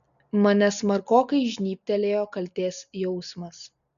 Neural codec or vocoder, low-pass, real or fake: none; 7.2 kHz; real